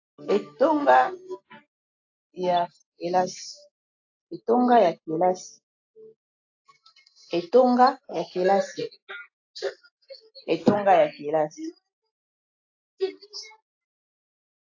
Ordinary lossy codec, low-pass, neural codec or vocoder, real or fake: AAC, 48 kbps; 7.2 kHz; none; real